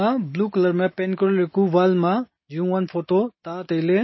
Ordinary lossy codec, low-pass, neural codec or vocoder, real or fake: MP3, 24 kbps; 7.2 kHz; none; real